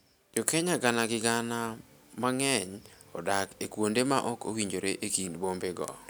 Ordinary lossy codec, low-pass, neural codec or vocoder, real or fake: none; none; none; real